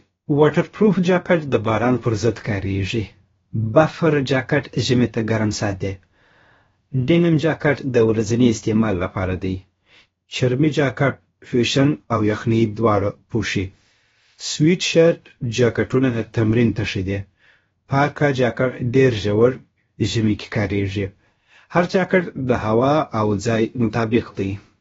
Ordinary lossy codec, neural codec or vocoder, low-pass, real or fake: AAC, 24 kbps; codec, 16 kHz, about 1 kbps, DyCAST, with the encoder's durations; 7.2 kHz; fake